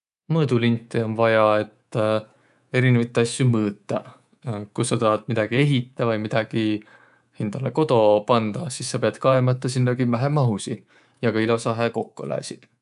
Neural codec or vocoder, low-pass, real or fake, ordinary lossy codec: codec, 24 kHz, 3.1 kbps, DualCodec; 10.8 kHz; fake; none